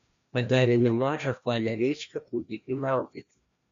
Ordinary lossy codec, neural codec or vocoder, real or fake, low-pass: MP3, 64 kbps; codec, 16 kHz, 1 kbps, FreqCodec, larger model; fake; 7.2 kHz